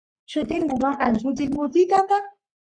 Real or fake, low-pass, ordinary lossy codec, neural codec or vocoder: fake; 9.9 kHz; Opus, 64 kbps; codec, 44.1 kHz, 3.4 kbps, Pupu-Codec